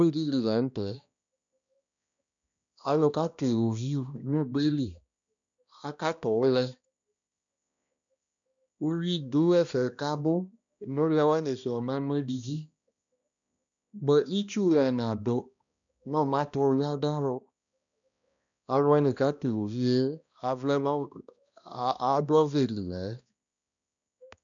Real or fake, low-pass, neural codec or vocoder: fake; 7.2 kHz; codec, 16 kHz, 1 kbps, X-Codec, HuBERT features, trained on balanced general audio